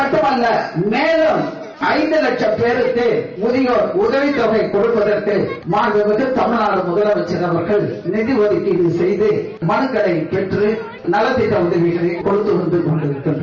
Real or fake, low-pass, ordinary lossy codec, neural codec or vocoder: real; 7.2 kHz; none; none